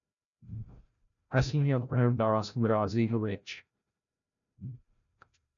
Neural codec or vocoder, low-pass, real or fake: codec, 16 kHz, 0.5 kbps, FreqCodec, larger model; 7.2 kHz; fake